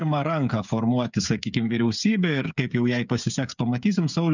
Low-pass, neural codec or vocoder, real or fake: 7.2 kHz; codec, 16 kHz, 8 kbps, FreqCodec, smaller model; fake